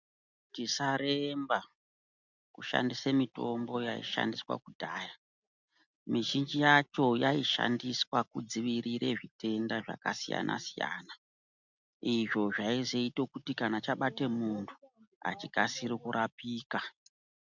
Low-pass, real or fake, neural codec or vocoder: 7.2 kHz; real; none